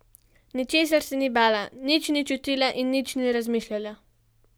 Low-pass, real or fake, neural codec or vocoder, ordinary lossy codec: none; real; none; none